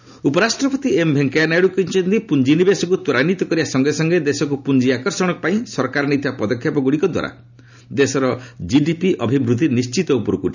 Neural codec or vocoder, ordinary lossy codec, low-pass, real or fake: none; none; 7.2 kHz; real